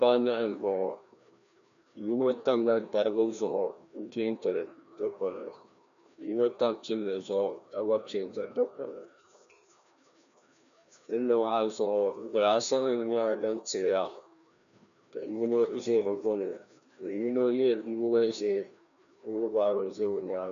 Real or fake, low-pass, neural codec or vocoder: fake; 7.2 kHz; codec, 16 kHz, 1 kbps, FreqCodec, larger model